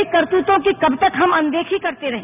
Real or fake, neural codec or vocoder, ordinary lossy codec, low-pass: real; none; none; 3.6 kHz